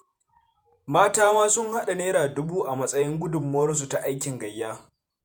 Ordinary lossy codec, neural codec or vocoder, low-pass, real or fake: none; vocoder, 48 kHz, 128 mel bands, Vocos; none; fake